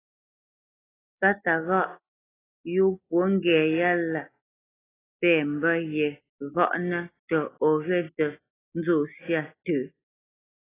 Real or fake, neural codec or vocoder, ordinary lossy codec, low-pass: real; none; AAC, 16 kbps; 3.6 kHz